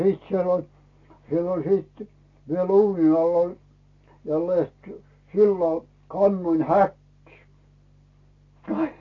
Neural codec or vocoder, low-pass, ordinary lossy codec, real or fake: none; 7.2 kHz; AAC, 32 kbps; real